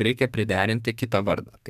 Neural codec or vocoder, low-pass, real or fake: codec, 32 kHz, 1.9 kbps, SNAC; 14.4 kHz; fake